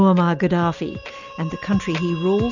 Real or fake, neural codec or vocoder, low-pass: real; none; 7.2 kHz